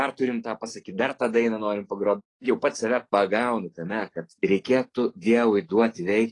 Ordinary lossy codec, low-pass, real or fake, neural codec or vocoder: AAC, 32 kbps; 10.8 kHz; fake; codec, 44.1 kHz, 7.8 kbps, DAC